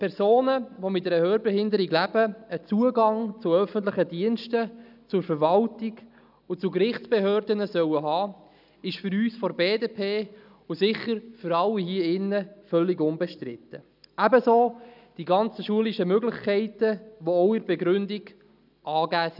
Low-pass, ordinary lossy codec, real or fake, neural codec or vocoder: 5.4 kHz; none; real; none